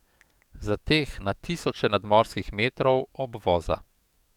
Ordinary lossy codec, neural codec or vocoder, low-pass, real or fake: none; codec, 44.1 kHz, 7.8 kbps, DAC; 19.8 kHz; fake